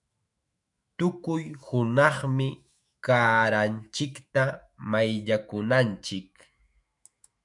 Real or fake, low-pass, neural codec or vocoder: fake; 10.8 kHz; autoencoder, 48 kHz, 128 numbers a frame, DAC-VAE, trained on Japanese speech